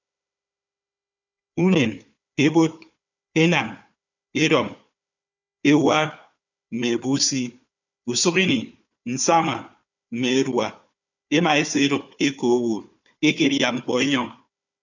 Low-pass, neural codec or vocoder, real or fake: 7.2 kHz; codec, 16 kHz, 4 kbps, FunCodec, trained on Chinese and English, 50 frames a second; fake